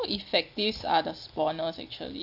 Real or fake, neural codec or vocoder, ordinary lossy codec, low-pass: real; none; none; 5.4 kHz